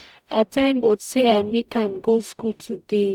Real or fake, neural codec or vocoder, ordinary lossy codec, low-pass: fake; codec, 44.1 kHz, 0.9 kbps, DAC; none; 19.8 kHz